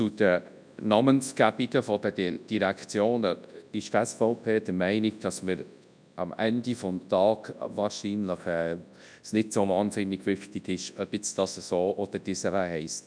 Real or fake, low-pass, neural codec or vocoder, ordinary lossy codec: fake; 9.9 kHz; codec, 24 kHz, 0.9 kbps, WavTokenizer, large speech release; none